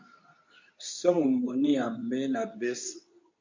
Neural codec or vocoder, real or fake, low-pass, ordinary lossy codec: codec, 24 kHz, 0.9 kbps, WavTokenizer, medium speech release version 2; fake; 7.2 kHz; MP3, 48 kbps